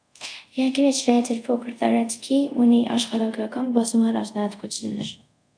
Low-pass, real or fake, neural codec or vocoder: 9.9 kHz; fake; codec, 24 kHz, 0.5 kbps, DualCodec